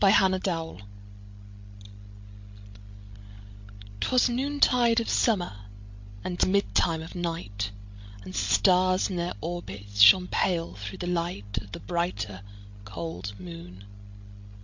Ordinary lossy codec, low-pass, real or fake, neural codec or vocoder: MP3, 48 kbps; 7.2 kHz; fake; codec, 16 kHz, 16 kbps, FreqCodec, larger model